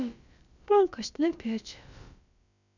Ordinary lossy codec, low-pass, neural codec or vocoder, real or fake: none; 7.2 kHz; codec, 16 kHz, about 1 kbps, DyCAST, with the encoder's durations; fake